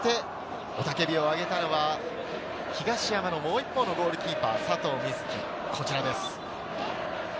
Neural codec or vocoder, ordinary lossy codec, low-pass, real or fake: none; none; none; real